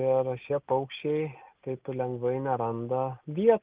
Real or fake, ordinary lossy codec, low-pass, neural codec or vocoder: real; Opus, 32 kbps; 3.6 kHz; none